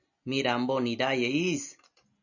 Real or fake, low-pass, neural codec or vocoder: real; 7.2 kHz; none